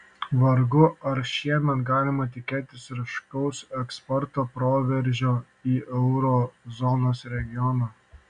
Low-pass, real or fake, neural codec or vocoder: 9.9 kHz; real; none